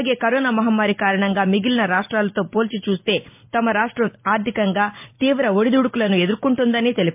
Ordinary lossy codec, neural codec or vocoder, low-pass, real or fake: MP3, 32 kbps; none; 3.6 kHz; real